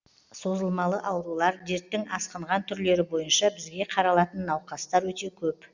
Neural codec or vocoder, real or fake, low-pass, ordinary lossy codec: none; real; 7.2 kHz; Opus, 64 kbps